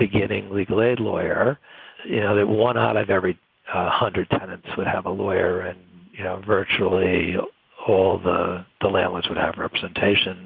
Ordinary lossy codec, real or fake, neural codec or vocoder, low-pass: Opus, 24 kbps; real; none; 5.4 kHz